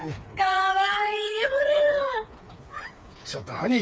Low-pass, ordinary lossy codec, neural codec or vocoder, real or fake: none; none; codec, 16 kHz, 4 kbps, FreqCodec, smaller model; fake